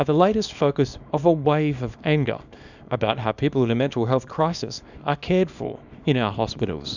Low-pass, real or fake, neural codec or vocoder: 7.2 kHz; fake; codec, 24 kHz, 0.9 kbps, WavTokenizer, small release